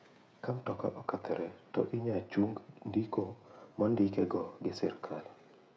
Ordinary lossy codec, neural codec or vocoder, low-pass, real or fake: none; codec, 16 kHz, 16 kbps, FreqCodec, smaller model; none; fake